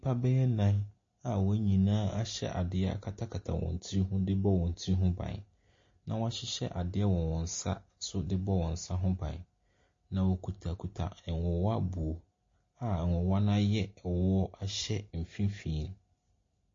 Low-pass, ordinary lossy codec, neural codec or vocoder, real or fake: 7.2 kHz; MP3, 32 kbps; none; real